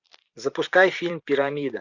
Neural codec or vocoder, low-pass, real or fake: vocoder, 44.1 kHz, 128 mel bands every 256 samples, BigVGAN v2; 7.2 kHz; fake